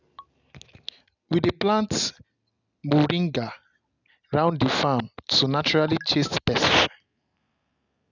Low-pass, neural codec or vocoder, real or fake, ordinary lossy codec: 7.2 kHz; none; real; none